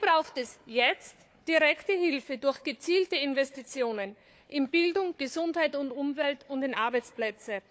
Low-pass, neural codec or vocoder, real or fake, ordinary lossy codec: none; codec, 16 kHz, 4 kbps, FunCodec, trained on Chinese and English, 50 frames a second; fake; none